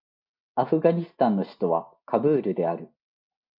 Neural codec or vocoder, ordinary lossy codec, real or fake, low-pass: none; MP3, 48 kbps; real; 5.4 kHz